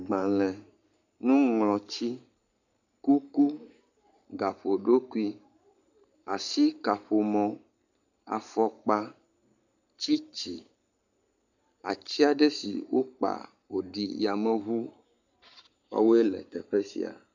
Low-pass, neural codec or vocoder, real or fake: 7.2 kHz; codec, 44.1 kHz, 7.8 kbps, Pupu-Codec; fake